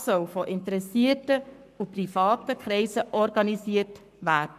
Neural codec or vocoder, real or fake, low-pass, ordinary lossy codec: codec, 44.1 kHz, 7.8 kbps, Pupu-Codec; fake; 14.4 kHz; none